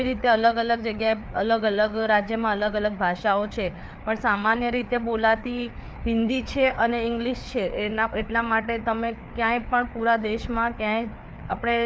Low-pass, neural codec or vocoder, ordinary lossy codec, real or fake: none; codec, 16 kHz, 4 kbps, FreqCodec, larger model; none; fake